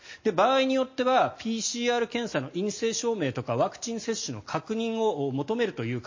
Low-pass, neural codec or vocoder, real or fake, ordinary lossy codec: 7.2 kHz; none; real; MP3, 32 kbps